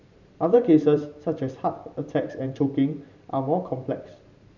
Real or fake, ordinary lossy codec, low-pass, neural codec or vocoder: fake; none; 7.2 kHz; vocoder, 44.1 kHz, 128 mel bands every 512 samples, BigVGAN v2